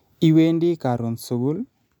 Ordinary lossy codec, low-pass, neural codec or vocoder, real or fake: none; 19.8 kHz; none; real